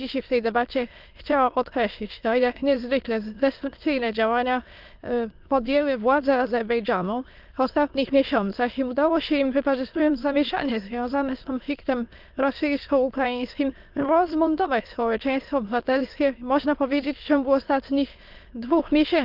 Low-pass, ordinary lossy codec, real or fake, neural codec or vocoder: 5.4 kHz; Opus, 24 kbps; fake; autoencoder, 22.05 kHz, a latent of 192 numbers a frame, VITS, trained on many speakers